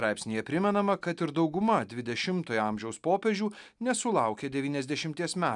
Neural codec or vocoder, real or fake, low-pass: none; real; 10.8 kHz